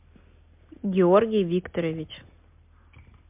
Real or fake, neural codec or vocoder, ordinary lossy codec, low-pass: real; none; MP3, 32 kbps; 3.6 kHz